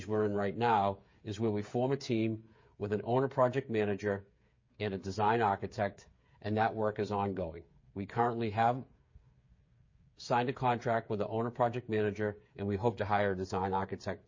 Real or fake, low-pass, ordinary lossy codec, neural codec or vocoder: fake; 7.2 kHz; MP3, 32 kbps; codec, 16 kHz, 8 kbps, FreqCodec, smaller model